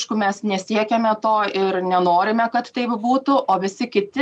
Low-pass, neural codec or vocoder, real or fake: 10.8 kHz; none; real